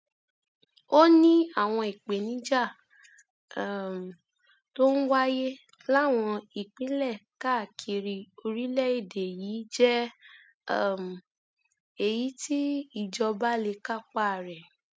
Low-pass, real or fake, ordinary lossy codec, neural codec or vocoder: none; real; none; none